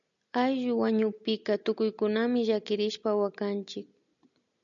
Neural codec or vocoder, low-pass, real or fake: none; 7.2 kHz; real